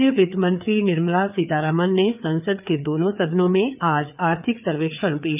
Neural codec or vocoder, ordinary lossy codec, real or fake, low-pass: codec, 16 kHz, 4 kbps, FreqCodec, larger model; none; fake; 3.6 kHz